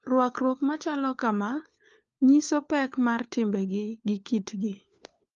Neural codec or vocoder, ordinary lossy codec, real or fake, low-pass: codec, 16 kHz, 4 kbps, FunCodec, trained on LibriTTS, 50 frames a second; Opus, 32 kbps; fake; 7.2 kHz